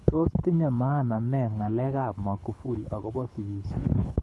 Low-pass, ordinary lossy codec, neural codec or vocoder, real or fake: none; none; codec, 24 kHz, 6 kbps, HILCodec; fake